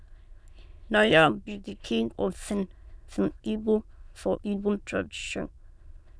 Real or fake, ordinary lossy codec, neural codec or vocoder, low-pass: fake; none; autoencoder, 22.05 kHz, a latent of 192 numbers a frame, VITS, trained on many speakers; none